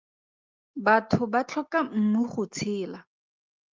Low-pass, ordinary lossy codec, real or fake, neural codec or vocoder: 7.2 kHz; Opus, 32 kbps; real; none